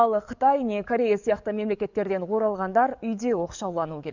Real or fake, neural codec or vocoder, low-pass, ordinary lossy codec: fake; codec, 44.1 kHz, 7.8 kbps, DAC; 7.2 kHz; none